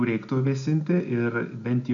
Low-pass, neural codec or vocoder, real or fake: 7.2 kHz; none; real